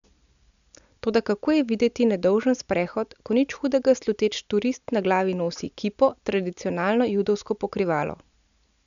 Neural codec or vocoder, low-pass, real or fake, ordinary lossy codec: none; 7.2 kHz; real; none